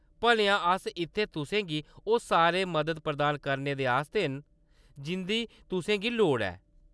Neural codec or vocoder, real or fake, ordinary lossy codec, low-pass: none; real; none; none